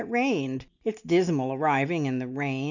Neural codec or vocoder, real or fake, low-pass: none; real; 7.2 kHz